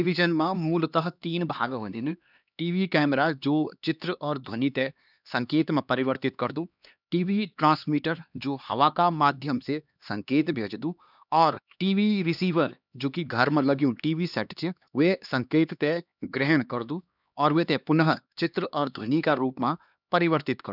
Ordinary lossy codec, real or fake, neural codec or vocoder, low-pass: none; fake; codec, 16 kHz, 2 kbps, X-Codec, HuBERT features, trained on LibriSpeech; 5.4 kHz